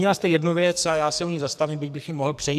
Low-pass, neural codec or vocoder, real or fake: 14.4 kHz; codec, 44.1 kHz, 2.6 kbps, SNAC; fake